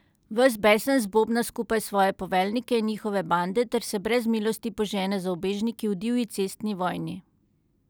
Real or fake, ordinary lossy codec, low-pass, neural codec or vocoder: real; none; none; none